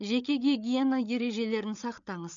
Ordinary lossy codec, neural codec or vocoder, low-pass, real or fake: none; codec, 16 kHz, 8 kbps, FreqCodec, larger model; 7.2 kHz; fake